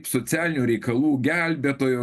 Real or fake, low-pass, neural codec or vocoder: real; 14.4 kHz; none